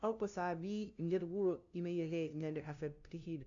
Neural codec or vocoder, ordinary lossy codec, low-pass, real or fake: codec, 16 kHz, 0.5 kbps, FunCodec, trained on LibriTTS, 25 frames a second; AAC, 64 kbps; 7.2 kHz; fake